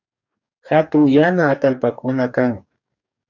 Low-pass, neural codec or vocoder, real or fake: 7.2 kHz; codec, 44.1 kHz, 2.6 kbps, DAC; fake